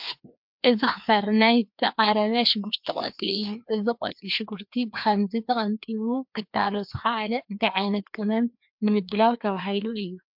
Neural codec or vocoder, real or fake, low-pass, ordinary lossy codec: codec, 16 kHz, 2 kbps, FreqCodec, larger model; fake; 5.4 kHz; MP3, 48 kbps